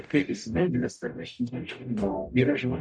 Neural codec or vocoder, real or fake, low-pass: codec, 44.1 kHz, 0.9 kbps, DAC; fake; 9.9 kHz